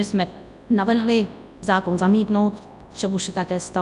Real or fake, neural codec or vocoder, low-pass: fake; codec, 24 kHz, 0.9 kbps, WavTokenizer, large speech release; 10.8 kHz